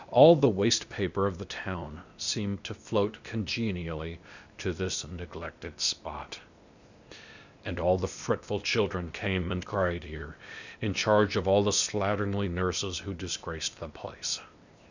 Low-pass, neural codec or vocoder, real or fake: 7.2 kHz; codec, 16 kHz, 0.8 kbps, ZipCodec; fake